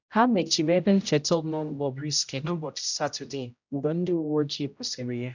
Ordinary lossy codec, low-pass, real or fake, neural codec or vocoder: none; 7.2 kHz; fake; codec, 16 kHz, 0.5 kbps, X-Codec, HuBERT features, trained on general audio